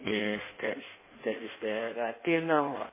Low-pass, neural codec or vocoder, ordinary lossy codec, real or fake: 3.6 kHz; codec, 16 kHz in and 24 kHz out, 1.1 kbps, FireRedTTS-2 codec; MP3, 16 kbps; fake